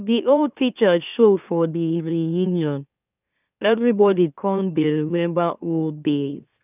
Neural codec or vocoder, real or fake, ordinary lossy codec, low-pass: autoencoder, 44.1 kHz, a latent of 192 numbers a frame, MeloTTS; fake; none; 3.6 kHz